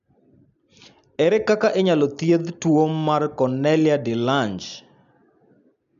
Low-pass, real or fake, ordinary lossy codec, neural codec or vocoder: 7.2 kHz; real; MP3, 96 kbps; none